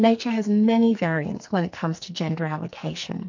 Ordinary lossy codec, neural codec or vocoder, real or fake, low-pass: MP3, 64 kbps; codec, 44.1 kHz, 2.6 kbps, SNAC; fake; 7.2 kHz